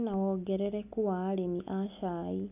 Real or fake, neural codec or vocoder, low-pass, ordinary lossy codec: real; none; 3.6 kHz; none